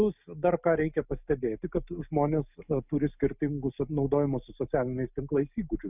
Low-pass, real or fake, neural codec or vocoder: 3.6 kHz; real; none